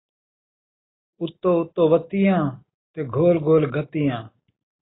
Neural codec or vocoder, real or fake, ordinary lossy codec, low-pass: none; real; AAC, 16 kbps; 7.2 kHz